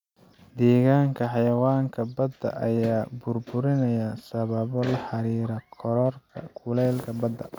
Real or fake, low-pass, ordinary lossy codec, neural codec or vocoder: real; 19.8 kHz; none; none